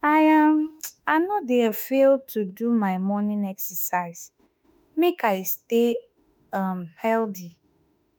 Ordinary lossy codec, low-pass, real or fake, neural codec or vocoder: none; none; fake; autoencoder, 48 kHz, 32 numbers a frame, DAC-VAE, trained on Japanese speech